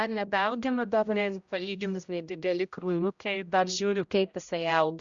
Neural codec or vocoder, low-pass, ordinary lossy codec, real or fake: codec, 16 kHz, 0.5 kbps, X-Codec, HuBERT features, trained on general audio; 7.2 kHz; Opus, 64 kbps; fake